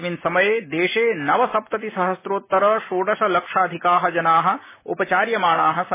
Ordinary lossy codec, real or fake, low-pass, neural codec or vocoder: MP3, 16 kbps; real; 3.6 kHz; none